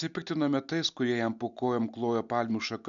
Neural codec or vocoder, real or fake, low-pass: none; real; 7.2 kHz